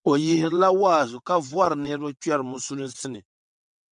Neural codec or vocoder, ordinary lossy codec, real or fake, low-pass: vocoder, 22.05 kHz, 80 mel bands, WaveNeXt; MP3, 96 kbps; fake; 9.9 kHz